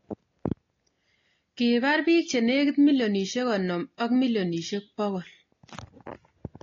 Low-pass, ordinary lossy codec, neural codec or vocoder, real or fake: 7.2 kHz; AAC, 32 kbps; none; real